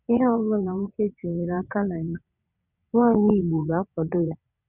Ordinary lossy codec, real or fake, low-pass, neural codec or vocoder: none; fake; 3.6 kHz; vocoder, 22.05 kHz, 80 mel bands, WaveNeXt